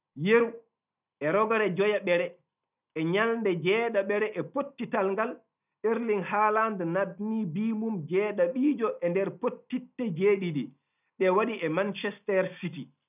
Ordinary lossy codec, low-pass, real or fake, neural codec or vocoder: none; 3.6 kHz; real; none